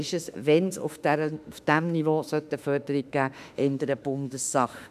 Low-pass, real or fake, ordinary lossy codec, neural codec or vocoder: 14.4 kHz; fake; none; autoencoder, 48 kHz, 32 numbers a frame, DAC-VAE, trained on Japanese speech